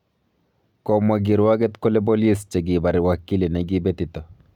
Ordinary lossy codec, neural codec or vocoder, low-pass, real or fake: none; none; 19.8 kHz; real